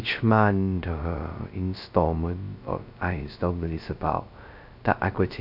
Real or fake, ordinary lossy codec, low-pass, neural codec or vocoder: fake; none; 5.4 kHz; codec, 16 kHz, 0.2 kbps, FocalCodec